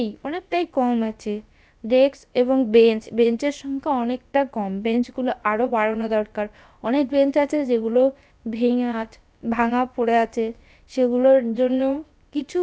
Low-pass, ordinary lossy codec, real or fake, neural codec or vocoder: none; none; fake; codec, 16 kHz, about 1 kbps, DyCAST, with the encoder's durations